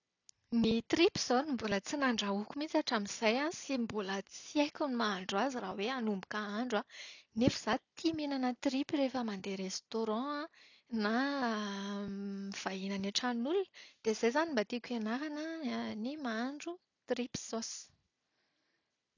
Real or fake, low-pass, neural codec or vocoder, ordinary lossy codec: real; 7.2 kHz; none; none